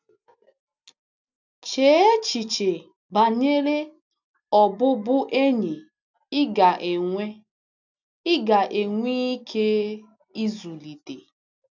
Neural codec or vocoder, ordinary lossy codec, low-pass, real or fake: none; none; 7.2 kHz; real